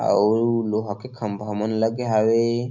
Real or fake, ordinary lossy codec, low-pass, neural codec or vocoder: real; none; 7.2 kHz; none